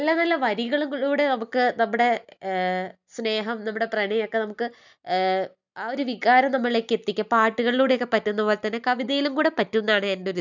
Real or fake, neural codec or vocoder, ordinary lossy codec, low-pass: real; none; none; 7.2 kHz